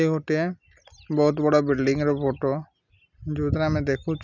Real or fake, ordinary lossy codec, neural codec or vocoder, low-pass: real; none; none; 7.2 kHz